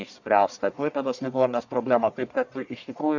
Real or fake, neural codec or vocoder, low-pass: fake; codec, 44.1 kHz, 1.7 kbps, Pupu-Codec; 7.2 kHz